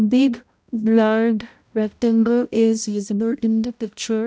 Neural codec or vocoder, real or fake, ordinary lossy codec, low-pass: codec, 16 kHz, 0.5 kbps, X-Codec, HuBERT features, trained on balanced general audio; fake; none; none